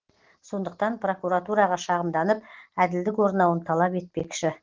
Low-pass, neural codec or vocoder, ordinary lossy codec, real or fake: 7.2 kHz; none; Opus, 16 kbps; real